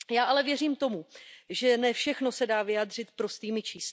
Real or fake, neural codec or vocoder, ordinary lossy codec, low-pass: real; none; none; none